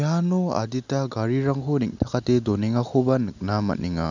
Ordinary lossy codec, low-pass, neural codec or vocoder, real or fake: none; 7.2 kHz; none; real